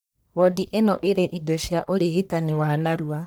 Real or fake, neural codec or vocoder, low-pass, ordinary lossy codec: fake; codec, 44.1 kHz, 1.7 kbps, Pupu-Codec; none; none